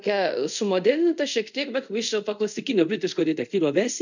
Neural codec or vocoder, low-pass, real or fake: codec, 24 kHz, 0.5 kbps, DualCodec; 7.2 kHz; fake